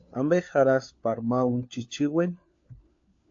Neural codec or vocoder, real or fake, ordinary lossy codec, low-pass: codec, 16 kHz, 4 kbps, FunCodec, trained on LibriTTS, 50 frames a second; fake; AAC, 48 kbps; 7.2 kHz